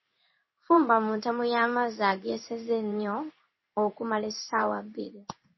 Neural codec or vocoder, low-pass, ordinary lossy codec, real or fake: codec, 16 kHz in and 24 kHz out, 1 kbps, XY-Tokenizer; 7.2 kHz; MP3, 24 kbps; fake